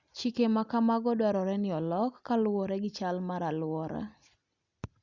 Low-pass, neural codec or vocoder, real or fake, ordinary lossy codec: 7.2 kHz; none; real; Opus, 64 kbps